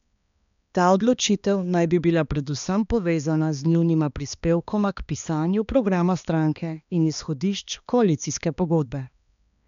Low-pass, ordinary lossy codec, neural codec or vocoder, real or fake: 7.2 kHz; none; codec, 16 kHz, 2 kbps, X-Codec, HuBERT features, trained on balanced general audio; fake